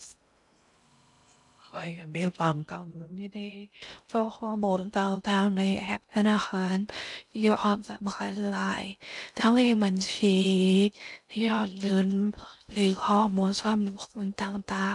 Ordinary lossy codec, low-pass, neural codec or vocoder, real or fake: none; 10.8 kHz; codec, 16 kHz in and 24 kHz out, 0.6 kbps, FocalCodec, streaming, 2048 codes; fake